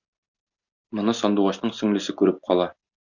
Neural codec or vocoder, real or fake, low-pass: none; real; 7.2 kHz